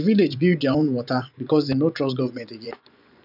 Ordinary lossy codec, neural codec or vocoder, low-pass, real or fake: none; vocoder, 24 kHz, 100 mel bands, Vocos; 5.4 kHz; fake